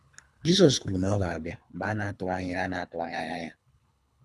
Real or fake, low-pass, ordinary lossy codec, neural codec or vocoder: fake; none; none; codec, 24 kHz, 3 kbps, HILCodec